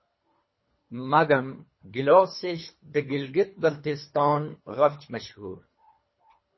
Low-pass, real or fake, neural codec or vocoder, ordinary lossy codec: 7.2 kHz; fake; codec, 24 kHz, 3 kbps, HILCodec; MP3, 24 kbps